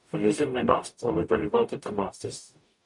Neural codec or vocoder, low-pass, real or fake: codec, 44.1 kHz, 0.9 kbps, DAC; 10.8 kHz; fake